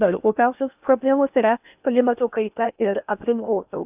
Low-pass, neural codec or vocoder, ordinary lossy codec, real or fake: 3.6 kHz; codec, 16 kHz in and 24 kHz out, 0.8 kbps, FocalCodec, streaming, 65536 codes; AAC, 32 kbps; fake